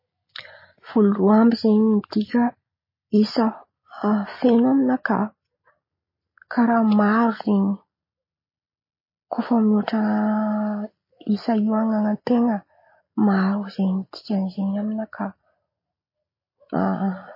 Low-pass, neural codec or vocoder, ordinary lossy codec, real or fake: 5.4 kHz; none; MP3, 24 kbps; real